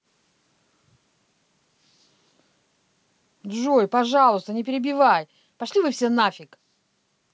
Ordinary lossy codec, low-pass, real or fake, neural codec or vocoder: none; none; real; none